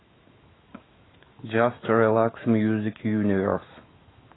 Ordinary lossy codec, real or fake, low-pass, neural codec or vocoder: AAC, 16 kbps; fake; 7.2 kHz; autoencoder, 48 kHz, 128 numbers a frame, DAC-VAE, trained on Japanese speech